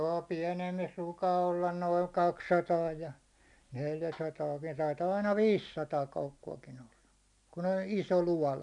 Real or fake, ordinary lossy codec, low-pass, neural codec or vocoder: real; none; 10.8 kHz; none